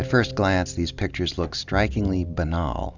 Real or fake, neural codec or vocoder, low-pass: real; none; 7.2 kHz